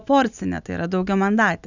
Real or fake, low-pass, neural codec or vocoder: real; 7.2 kHz; none